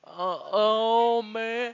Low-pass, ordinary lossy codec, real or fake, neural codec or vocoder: 7.2 kHz; AAC, 48 kbps; real; none